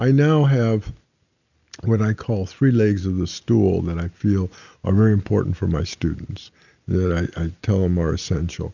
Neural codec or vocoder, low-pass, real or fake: none; 7.2 kHz; real